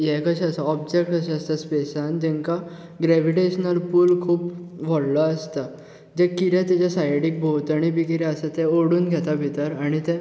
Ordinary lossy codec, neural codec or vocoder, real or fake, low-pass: none; none; real; none